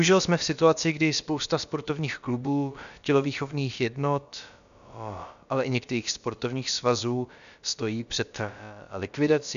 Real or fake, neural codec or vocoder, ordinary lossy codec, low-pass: fake; codec, 16 kHz, about 1 kbps, DyCAST, with the encoder's durations; MP3, 96 kbps; 7.2 kHz